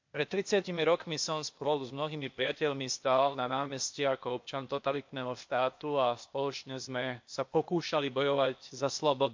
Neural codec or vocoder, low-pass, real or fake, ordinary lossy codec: codec, 16 kHz, 0.8 kbps, ZipCodec; 7.2 kHz; fake; MP3, 48 kbps